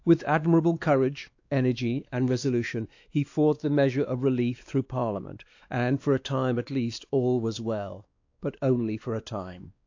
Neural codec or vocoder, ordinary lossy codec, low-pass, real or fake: codec, 16 kHz, 2 kbps, X-Codec, WavLM features, trained on Multilingual LibriSpeech; AAC, 48 kbps; 7.2 kHz; fake